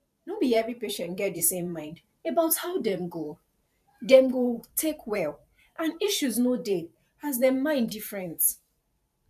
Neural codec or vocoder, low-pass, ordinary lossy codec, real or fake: vocoder, 44.1 kHz, 128 mel bands every 512 samples, BigVGAN v2; 14.4 kHz; none; fake